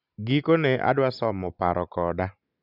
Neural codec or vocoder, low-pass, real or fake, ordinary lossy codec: none; 5.4 kHz; real; none